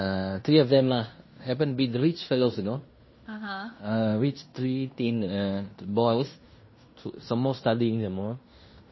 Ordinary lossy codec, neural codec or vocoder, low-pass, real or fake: MP3, 24 kbps; codec, 16 kHz in and 24 kHz out, 0.9 kbps, LongCat-Audio-Codec, fine tuned four codebook decoder; 7.2 kHz; fake